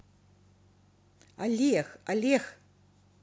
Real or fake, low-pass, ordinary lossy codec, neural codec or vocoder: real; none; none; none